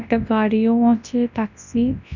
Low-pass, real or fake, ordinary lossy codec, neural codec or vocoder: 7.2 kHz; fake; none; codec, 24 kHz, 0.9 kbps, WavTokenizer, large speech release